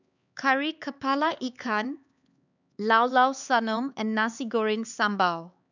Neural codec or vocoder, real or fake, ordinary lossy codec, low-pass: codec, 16 kHz, 4 kbps, X-Codec, HuBERT features, trained on LibriSpeech; fake; none; 7.2 kHz